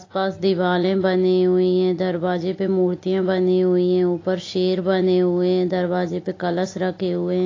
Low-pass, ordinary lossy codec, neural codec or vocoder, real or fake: 7.2 kHz; AAC, 32 kbps; none; real